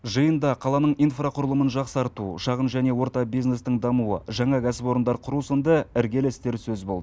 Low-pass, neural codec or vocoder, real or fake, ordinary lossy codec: none; none; real; none